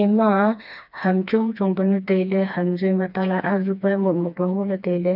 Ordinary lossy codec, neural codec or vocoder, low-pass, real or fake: none; codec, 16 kHz, 2 kbps, FreqCodec, smaller model; 5.4 kHz; fake